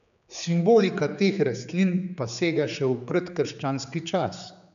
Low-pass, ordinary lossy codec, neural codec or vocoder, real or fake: 7.2 kHz; none; codec, 16 kHz, 4 kbps, X-Codec, HuBERT features, trained on general audio; fake